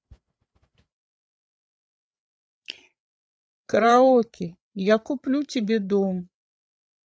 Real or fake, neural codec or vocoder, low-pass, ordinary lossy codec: fake; codec, 16 kHz, 8 kbps, FreqCodec, larger model; none; none